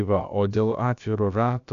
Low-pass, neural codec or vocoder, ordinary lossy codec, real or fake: 7.2 kHz; codec, 16 kHz, about 1 kbps, DyCAST, with the encoder's durations; MP3, 96 kbps; fake